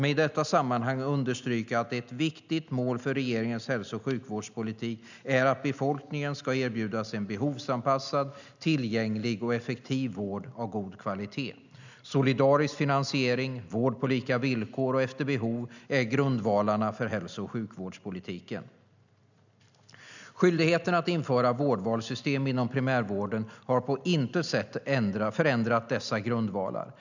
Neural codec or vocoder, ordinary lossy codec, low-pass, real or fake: none; none; 7.2 kHz; real